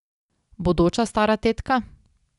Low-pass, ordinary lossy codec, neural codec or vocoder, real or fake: 10.8 kHz; none; none; real